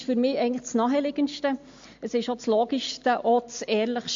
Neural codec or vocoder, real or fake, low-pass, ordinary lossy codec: none; real; 7.2 kHz; MP3, 48 kbps